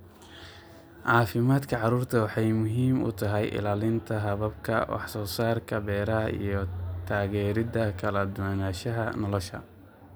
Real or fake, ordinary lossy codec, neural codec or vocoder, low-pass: real; none; none; none